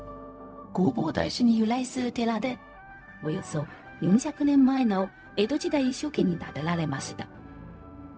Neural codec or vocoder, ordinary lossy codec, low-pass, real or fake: codec, 16 kHz, 0.4 kbps, LongCat-Audio-Codec; none; none; fake